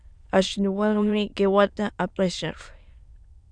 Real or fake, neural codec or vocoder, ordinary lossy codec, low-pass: fake; autoencoder, 22.05 kHz, a latent of 192 numbers a frame, VITS, trained on many speakers; Opus, 64 kbps; 9.9 kHz